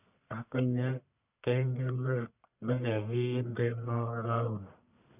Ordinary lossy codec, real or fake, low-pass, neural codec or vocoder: none; fake; 3.6 kHz; codec, 44.1 kHz, 1.7 kbps, Pupu-Codec